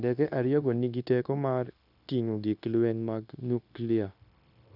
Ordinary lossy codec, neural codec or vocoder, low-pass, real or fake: none; codec, 16 kHz, 0.9 kbps, LongCat-Audio-Codec; 5.4 kHz; fake